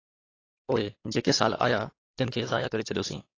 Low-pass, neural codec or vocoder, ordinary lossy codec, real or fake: 7.2 kHz; codec, 16 kHz, 4 kbps, X-Codec, WavLM features, trained on Multilingual LibriSpeech; AAC, 32 kbps; fake